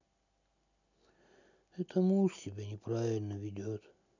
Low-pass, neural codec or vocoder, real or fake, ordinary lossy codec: 7.2 kHz; none; real; none